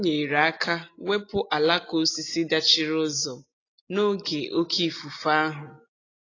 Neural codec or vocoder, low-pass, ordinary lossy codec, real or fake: none; 7.2 kHz; AAC, 32 kbps; real